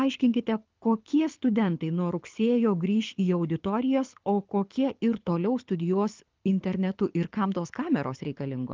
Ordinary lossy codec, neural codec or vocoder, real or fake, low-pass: Opus, 32 kbps; codec, 24 kHz, 6 kbps, HILCodec; fake; 7.2 kHz